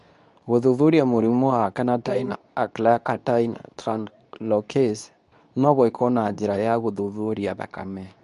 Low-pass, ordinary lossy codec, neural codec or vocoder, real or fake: 10.8 kHz; none; codec, 24 kHz, 0.9 kbps, WavTokenizer, medium speech release version 2; fake